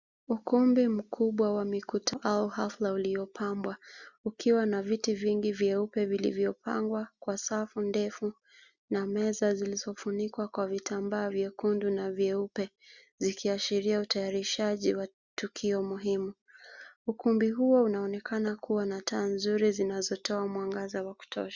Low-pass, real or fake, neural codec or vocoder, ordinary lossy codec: 7.2 kHz; real; none; Opus, 64 kbps